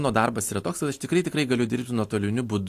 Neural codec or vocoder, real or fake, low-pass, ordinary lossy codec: none; real; 14.4 kHz; AAC, 64 kbps